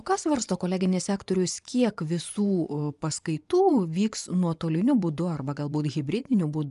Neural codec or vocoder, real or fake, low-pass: vocoder, 24 kHz, 100 mel bands, Vocos; fake; 10.8 kHz